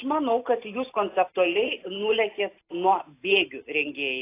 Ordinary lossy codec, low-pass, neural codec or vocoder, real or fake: AAC, 24 kbps; 3.6 kHz; none; real